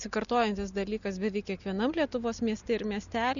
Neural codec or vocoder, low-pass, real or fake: none; 7.2 kHz; real